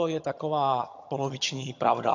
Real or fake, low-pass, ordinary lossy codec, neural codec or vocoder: fake; 7.2 kHz; AAC, 48 kbps; vocoder, 22.05 kHz, 80 mel bands, HiFi-GAN